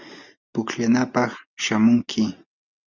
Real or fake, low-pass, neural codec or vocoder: real; 7.2 kHz; none